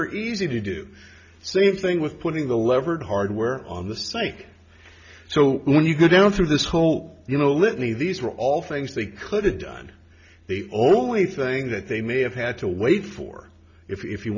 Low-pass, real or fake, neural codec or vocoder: 7.2 kHz; real; none